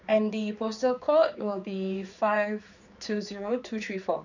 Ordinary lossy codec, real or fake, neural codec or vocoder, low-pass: none; fake; codec, 16 kHz, 4 kbps, X-Codec, HuBERT features, trained on general audio; 7.2 kHz